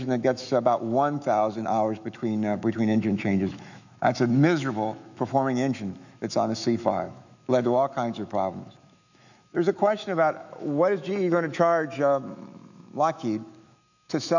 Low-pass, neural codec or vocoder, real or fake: 7.2 kHz; autoencoder, 48 kHz, 128 numbers a frame, DAC-VAE, trained on Japanese speech; fake